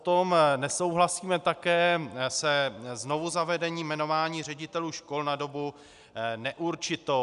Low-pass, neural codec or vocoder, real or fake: 10.8 kHz; none; real